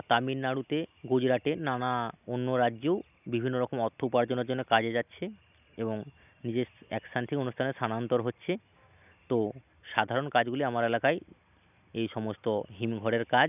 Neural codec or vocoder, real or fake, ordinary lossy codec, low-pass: none; real; none; 3.6 kHz